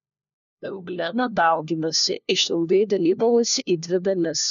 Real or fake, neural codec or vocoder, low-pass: fake; codec, 16 kHz, 1 kbps, FunCodec, trained on LibriTTS, 50 frames a second; 7.2 kHz